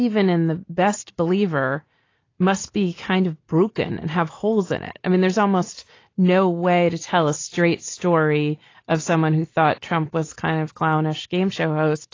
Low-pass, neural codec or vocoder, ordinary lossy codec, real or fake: 7.2 kHz; none; AAC, 32 kbps; real